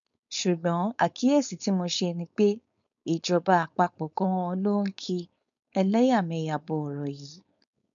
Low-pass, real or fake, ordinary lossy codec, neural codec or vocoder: 7.2 kHz; fake; none; codec, 16 kHz, 4.8 kbps, FACodec